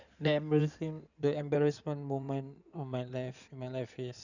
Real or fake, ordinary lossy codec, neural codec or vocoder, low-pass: fake; none; codec, 16 kHz in and 24 kHz out, 2.2 kbps, FireRedTTS-2 codec; 7.2 kHz